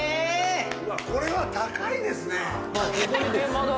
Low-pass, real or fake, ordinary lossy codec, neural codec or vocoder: none; real; none; none